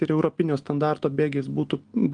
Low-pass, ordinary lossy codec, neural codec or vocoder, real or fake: 9.9 kHz; Opus, 24 kbps; none; real